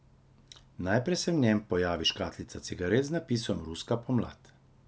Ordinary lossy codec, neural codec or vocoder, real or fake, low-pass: none; none; real; none